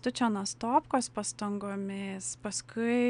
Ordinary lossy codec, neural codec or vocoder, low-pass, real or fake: MP3, 96 kbps; none; 9.9 kHz; real